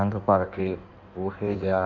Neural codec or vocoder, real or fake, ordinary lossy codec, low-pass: codec, 16 kHz in and 24 kHz out, 1.1 kbps, FireRedTTS-2 codec; fake; none; 7.2 kHz